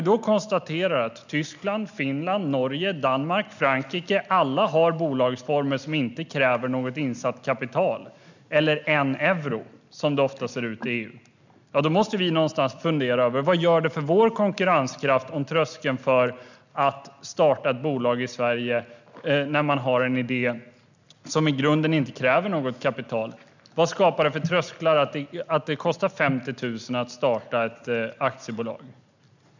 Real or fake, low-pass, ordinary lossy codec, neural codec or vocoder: real; 7.2 kHz; none; none